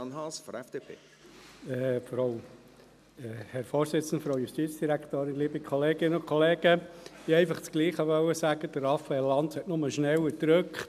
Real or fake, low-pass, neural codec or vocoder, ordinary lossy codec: real; 14.4 kHz; none; none